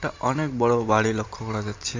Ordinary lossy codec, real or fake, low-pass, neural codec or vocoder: MP3, 48 kbps; fake; 7.2 kHz; vocoder, 44.1 kHz, 128 mel bands every 512 samples, BigVGAN v2